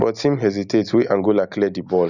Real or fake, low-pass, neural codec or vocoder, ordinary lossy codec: real; 7.2 kHz; none; none